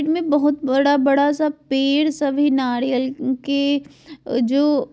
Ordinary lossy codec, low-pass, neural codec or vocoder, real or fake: none; none; none; real